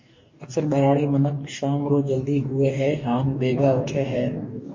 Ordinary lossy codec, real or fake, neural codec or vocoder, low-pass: MP3, 32 kbps; fake; codec, 44.1 kHz, 2.6 kbps, DAC; 7.2 kHz